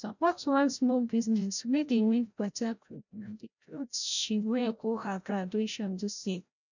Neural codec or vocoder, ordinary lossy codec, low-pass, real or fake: codec, 16 kHz, 0.5 kbps, FreqCodec, larger model; none; 7.2 kHz; fake